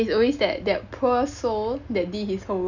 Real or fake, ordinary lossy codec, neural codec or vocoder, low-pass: real; none; none; 7.2 kHz